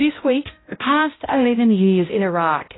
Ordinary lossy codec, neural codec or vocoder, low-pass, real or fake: AAC, 16 kbps; codec, 16 kHz, 0.5 kbps, X-Codec, HuBERT features, trained on balanced general audio; 7.2 kHz; fake